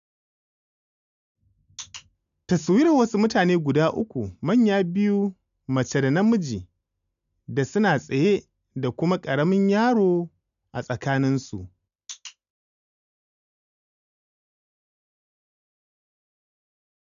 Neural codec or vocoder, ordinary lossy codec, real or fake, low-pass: none; none; real; 7.2 kHz